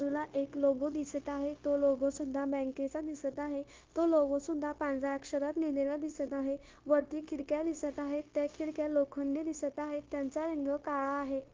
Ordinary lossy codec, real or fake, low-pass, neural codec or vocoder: Opus, 16 kbps; fake; 7.2 kHz; codec, 16 kHz, 0.9 kbps, LongCat-Audio-Codec